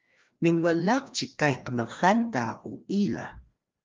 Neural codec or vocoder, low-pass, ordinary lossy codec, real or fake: codec, 16 kHz, 1 kbps, FreqCodec, larger model; 7.2 kHz; Opus, 24 kbps; fake